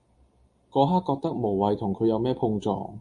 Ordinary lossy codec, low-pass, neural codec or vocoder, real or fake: MP3, 48 kbps; 10.8 kHz; none; real